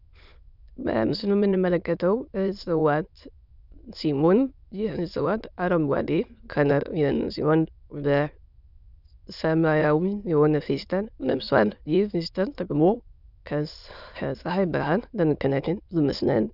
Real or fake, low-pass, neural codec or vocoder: fake; 5.4 kHz; autoencoder, 22.05 kHz, a latent of 192 numbers a frame, VITS, trained on many speakers